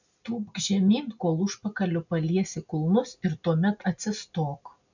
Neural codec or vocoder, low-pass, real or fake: none; 7.2 kHz; real